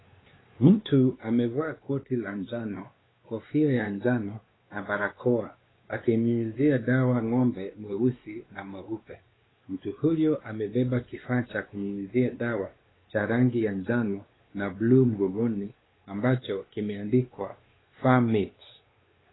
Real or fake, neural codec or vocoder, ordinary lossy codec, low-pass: fake; codec, 16 kHz, 2 kbps, X-Codec, WavLM features, trained on Multilingual LibriSpeech; AAC, 16 kbps; 7.2 kHz